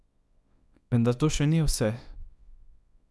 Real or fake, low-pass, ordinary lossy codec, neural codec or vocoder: fake; none; none; codec, 24 kHz, 0.9 kbps, WavTokenizer, small release